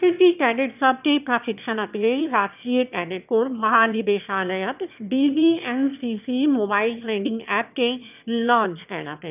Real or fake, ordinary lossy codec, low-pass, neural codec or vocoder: fake; none; 3.6 kHz; autoencoder, 22.05 kHz, a latent of 192 numbers a frame, VITS, trained on one speaker